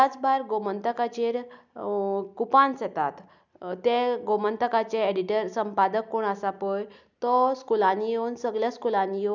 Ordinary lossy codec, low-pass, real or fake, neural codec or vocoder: none; 7.2 kHz; real; none